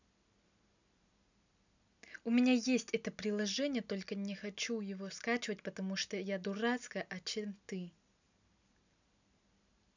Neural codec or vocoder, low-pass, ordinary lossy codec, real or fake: none; 7.2 kHz; none; real